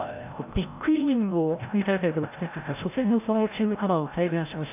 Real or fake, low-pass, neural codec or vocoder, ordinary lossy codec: fake; 3.6 kHz; codec, 16 kHz, 0.5 kbps, FreqCodec, larger model; AAC, 32 kbps